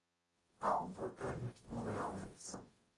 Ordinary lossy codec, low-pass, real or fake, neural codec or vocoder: AAC, 32 kbps; 10.8 kHz; fake; codec, 44.1 kHz, 0.9 kbps, DAC